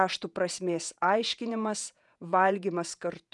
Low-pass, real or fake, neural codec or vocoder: 10.8 kHz; fake; vocoder, 48 kHz, 128 mel bands, Vocos